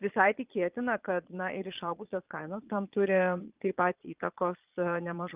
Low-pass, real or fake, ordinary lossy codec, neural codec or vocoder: 3.6 kHz; fake; Opus, 24 kbps; vocoder, 22.05 kHz, 80 mel bands, Vocos